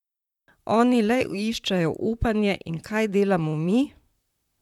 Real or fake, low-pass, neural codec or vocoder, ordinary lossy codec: fake; 19.8 kHz; vocoder, 44.1 kHz, 128 mel bands, Pupu-Vocoder; none